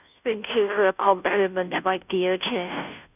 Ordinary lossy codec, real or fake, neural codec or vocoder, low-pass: none; fake; codec, 16 kHz, 0.5 kbps, FunCodec, trained on Chinese and English, 25 frames a second; 3.6 kHz